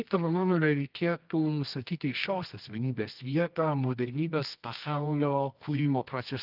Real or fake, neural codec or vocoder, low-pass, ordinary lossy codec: fake; codec, 24 kHz, 0.9 kbps, WavTokenizer, medium music audio release; 5.4 kHz; Opus, 24 kbps